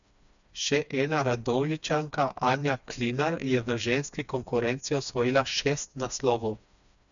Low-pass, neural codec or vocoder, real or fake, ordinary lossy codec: 7.2 kHz; codec, 16 kHz, 2 kbps, FreqCodec, smaller model; fake; none